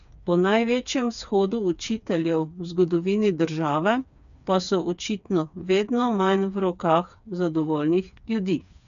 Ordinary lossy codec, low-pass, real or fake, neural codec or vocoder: none; 7.2 kHz; fake; codec, 16 kHz, 4 kbps, FreqCodec, smaller model